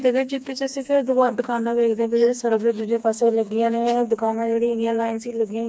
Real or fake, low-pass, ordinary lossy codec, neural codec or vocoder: fake; none; none; codec, 16 kHz, 2 kbps, FreqCodec, smaller model